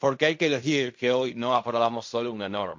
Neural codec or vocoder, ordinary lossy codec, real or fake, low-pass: codec, 16 kHz in and 24 kHz out, 0.4 kbps, LongCat-Audio-Codec, fine tuned four codebook decoder; MP3, 48 kbps; fake; 7.2 kHz